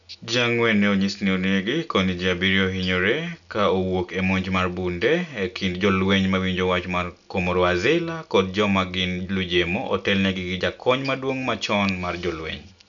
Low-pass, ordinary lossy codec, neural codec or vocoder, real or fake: 7.2 kHz; none; none; real